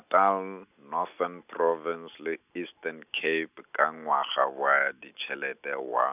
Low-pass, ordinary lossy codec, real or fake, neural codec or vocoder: 3.6 kHz; none; real; none